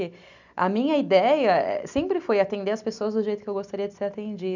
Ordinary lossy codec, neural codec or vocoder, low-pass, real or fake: none; none; 7.2 kHz; real